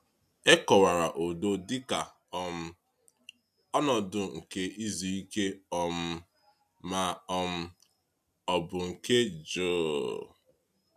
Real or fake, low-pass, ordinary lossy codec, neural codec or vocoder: real; 14.4 kHz; none; none